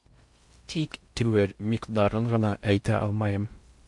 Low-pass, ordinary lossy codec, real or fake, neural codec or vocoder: 10.8 kHz; MP3, 64 kbps; fake; codec, 16 kHz in and 24 kHz out, 0.6 kbps, FocalCodec, streaming, 4096 codes